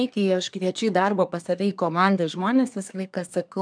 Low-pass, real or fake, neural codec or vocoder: 9.9 kHz; fake; codec, 24 kHz, 1 kbps, SNAC